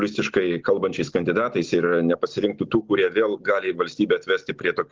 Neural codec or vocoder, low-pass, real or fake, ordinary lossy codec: none; 7.2 kHz; real; Opus, 16 kbps